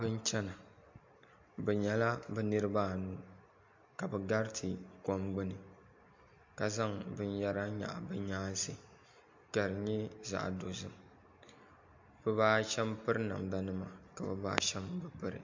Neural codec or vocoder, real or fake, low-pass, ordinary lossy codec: none; real; 7.2 kHz; MP3, 48 kbps